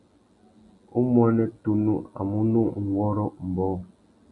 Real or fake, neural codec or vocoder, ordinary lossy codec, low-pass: real; none; MP3, 96 kbps; 10.8 kHz